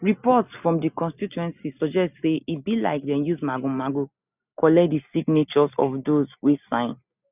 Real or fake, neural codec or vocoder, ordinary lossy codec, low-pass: real; none; none; 3.6 kHz